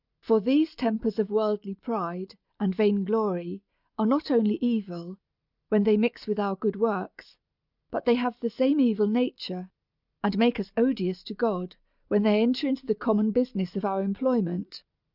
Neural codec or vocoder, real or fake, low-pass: none; real; 5.4 kHz